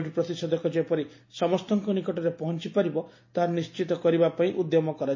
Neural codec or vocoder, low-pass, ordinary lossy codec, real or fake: none; 7.2 kHz; MP3, 32 kbps; real